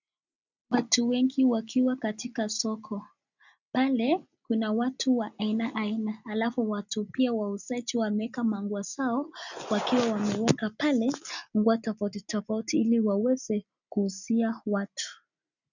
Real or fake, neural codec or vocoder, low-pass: real; none; 7.2 kHz